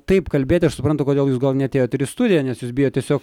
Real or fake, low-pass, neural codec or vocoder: real; 19.8 kHz; none